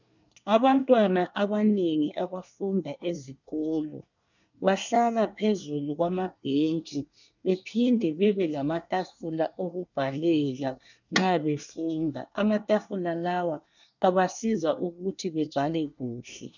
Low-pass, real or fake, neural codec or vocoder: 7.2 kHz; fake; codec, 24 kHz, 1 kbps, SNAC